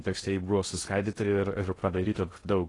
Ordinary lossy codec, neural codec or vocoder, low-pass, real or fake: AAC, 32 kbps; codec, 16 kHz in and 24 kHz out, 0.6 kbps, FocalCodec, streaming, 4096 codes; 10.8 kHz; fake